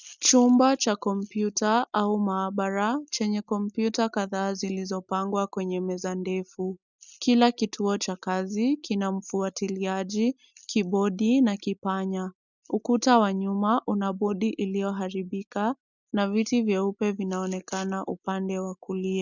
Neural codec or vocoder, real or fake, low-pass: none; real; 7.2 kHz